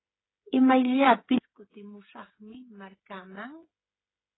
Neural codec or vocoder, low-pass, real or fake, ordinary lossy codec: codec, 16 kHz, 8 kbps, FreqCodec, smaller model; 7.2 kHz; fake; AAC, 16 kbps